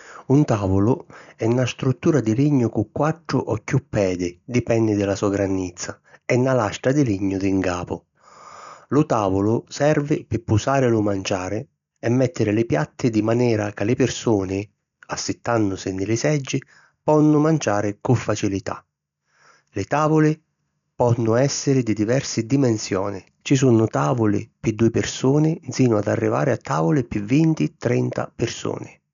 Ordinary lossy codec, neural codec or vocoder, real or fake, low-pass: none; none; real; 7.2 kHz